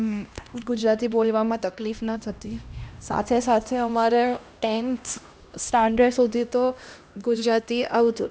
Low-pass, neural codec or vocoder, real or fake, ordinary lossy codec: none; codec, 16 kHz, 1 kbps, X-Codec, HuBERT features, trained on LibriSpeech; fake; none